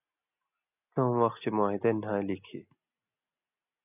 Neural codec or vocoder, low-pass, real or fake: none; 3.6 kHz; real